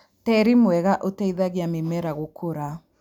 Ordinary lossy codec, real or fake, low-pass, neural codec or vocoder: none; real; 19.8 kHz; none